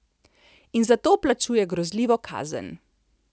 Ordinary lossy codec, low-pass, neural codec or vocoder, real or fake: none; none; none; real